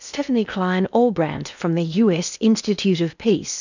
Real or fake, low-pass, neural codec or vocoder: fake; 7.2 kHz; codec, 16 kHz in and 24 kHz out, 0.8 kbps, FocalCodec, streaming, 65536 codes